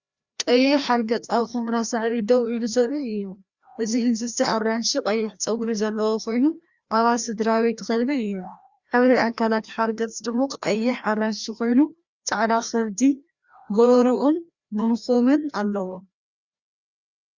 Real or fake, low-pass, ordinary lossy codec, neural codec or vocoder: fake; 7.2 kHz; Opus, 64 kbps; codec, 16 kHz, 1 kbps, FreqCodec, larger model